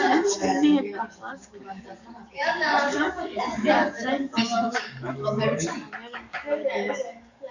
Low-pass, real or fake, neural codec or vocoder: 7.2 kHz; fake; codec, 44.1 kHz, 7.8 kbps, DAC